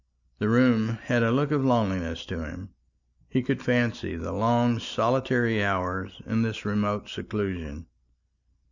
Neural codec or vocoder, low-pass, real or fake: none; 7.2 kHz; real